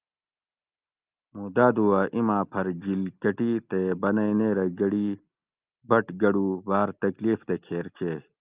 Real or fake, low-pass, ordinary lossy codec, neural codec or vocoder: real; 3.6 kHz; Opus, 32 kbps; none